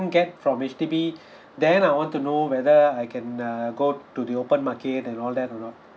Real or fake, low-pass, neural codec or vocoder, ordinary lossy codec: real; none; none; none